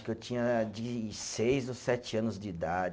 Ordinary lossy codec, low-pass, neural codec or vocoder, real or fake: none; none; none; real